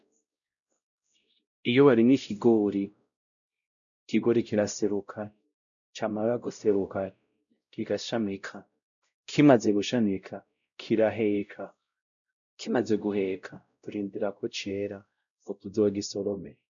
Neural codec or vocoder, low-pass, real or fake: codec, 16 kHz, 0.5 kbps, X-Codec, WavLM features, trained on Multilingual LibriSpeech; 7.2 kHz; fake